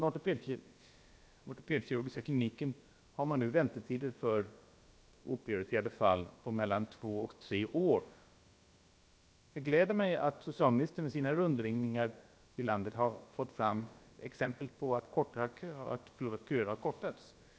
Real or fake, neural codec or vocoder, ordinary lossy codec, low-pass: fake; codec, 16 kHz, about 1 kbps, DyCAST, with the encoder's durations; none; none